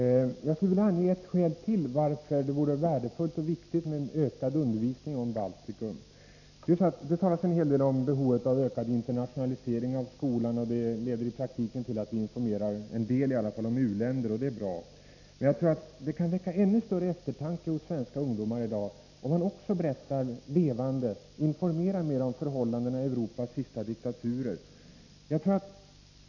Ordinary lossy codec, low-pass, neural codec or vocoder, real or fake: none; 7.2 kHz; none; real